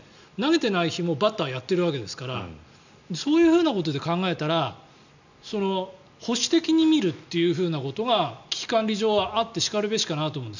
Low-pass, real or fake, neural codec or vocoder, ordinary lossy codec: 7.2 kHz; real; none; none